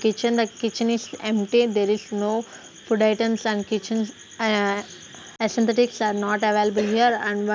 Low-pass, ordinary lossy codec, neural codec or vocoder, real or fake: none; none; none; real